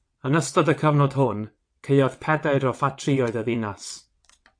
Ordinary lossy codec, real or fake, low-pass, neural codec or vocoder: AAC, 64 kbps; fake; 9.9 kHz; vocoder, 22.05 kHz, 80 mel bands, WaveNeXt